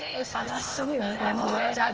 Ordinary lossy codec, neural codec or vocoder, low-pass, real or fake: Opus, 24 kbps; codec, 16 kHz, 0.8 kbps, ZipCodec; 7.2 kHz; fake